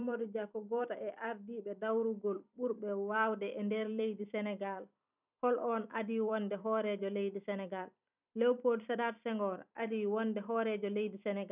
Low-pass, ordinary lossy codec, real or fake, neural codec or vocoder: 3.6 kHz; none; fake; vocoder, 44.1 kHz, 128 mel bands every 256 samples, BigVGAN v2